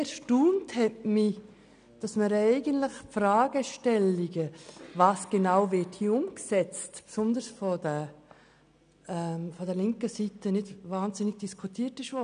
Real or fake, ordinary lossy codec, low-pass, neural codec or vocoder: real; none; 9.9 kHz; none